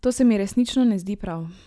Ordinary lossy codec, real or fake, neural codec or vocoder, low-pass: none; real; none; none